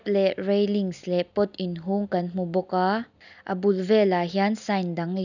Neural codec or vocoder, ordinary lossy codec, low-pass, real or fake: none; none; 7.2 kHz; real